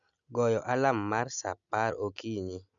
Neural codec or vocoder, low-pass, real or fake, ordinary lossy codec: none; 7.2 kHz; real; none